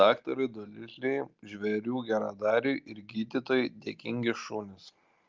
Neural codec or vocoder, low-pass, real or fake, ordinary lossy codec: none; 7.2 kHz; real; Opus, 32 kbps